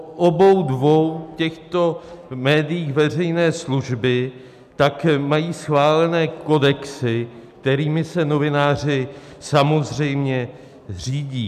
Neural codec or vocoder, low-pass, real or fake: none; 14.4 kHz; real